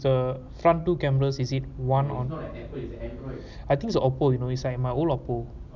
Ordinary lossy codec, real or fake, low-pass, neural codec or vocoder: none; real; 7.2 kHz; none